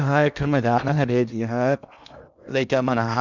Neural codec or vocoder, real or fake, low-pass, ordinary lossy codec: codec, 16 kHz in and 24 kHz out, 0.8 kbps, FocalCodec, streaming, 65536 codes; fake; 7.2 kHz; none